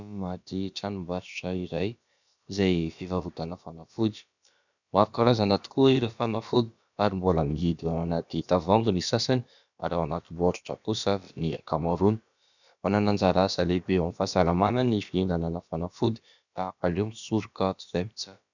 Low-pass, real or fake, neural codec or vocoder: 7.2 kHz; fake; codec, 16 kHz, about 1 kbps, DyCAST, with the encoder's durations